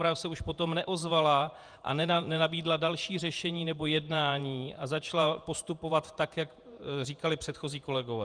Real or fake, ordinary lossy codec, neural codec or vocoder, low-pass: fake; Opus, 32 kbps; vocoder, 48 kHz, 128 mel bands, Vocos; 9.9 kHz